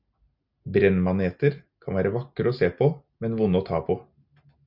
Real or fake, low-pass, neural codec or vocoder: real; 5.4 kHz; none